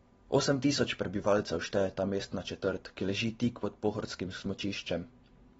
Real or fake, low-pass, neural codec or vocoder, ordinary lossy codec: real; 19.8 kHz; none; AAC, 24 kbps